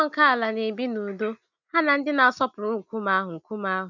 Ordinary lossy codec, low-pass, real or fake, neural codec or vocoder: none; 7.2 kHz; real; none